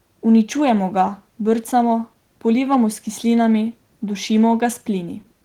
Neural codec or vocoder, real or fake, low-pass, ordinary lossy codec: none; real; 19.8 kHz; Opus, 16 kbps